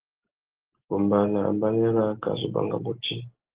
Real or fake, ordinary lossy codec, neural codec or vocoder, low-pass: real; Opus, 16 kbps; none; 3.6 kHz